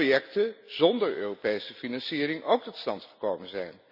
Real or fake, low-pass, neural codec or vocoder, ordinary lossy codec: real; 5.4 kHz; none; none